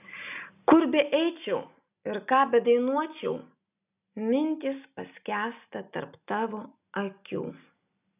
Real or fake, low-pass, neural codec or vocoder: real; 3.6 kHz; none